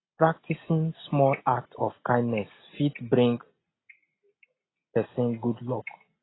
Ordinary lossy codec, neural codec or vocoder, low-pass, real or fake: AAC, 16 kbps; none; 7.2 kHz; real